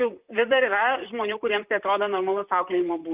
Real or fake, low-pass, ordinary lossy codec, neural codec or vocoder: fake; 3.6 kHz; Opus, 24 kbps; vocoder, 44.1 kHz, 128 mel bands, Pupu-Vocoder